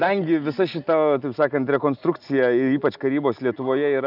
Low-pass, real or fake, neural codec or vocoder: 5.4 kHz; real; none